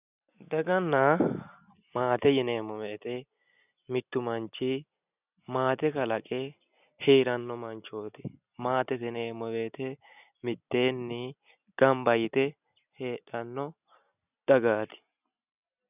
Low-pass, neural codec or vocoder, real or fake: 3.6 kHz; none; real